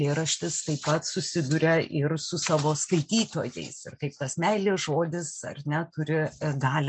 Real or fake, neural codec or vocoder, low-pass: real; none; 9.9 kHz